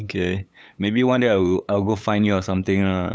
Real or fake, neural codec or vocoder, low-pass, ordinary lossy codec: fake; codec, 16 kHz, 8 kbps, FunCodec, trained on LibriTTS, 25 frames a second; none; none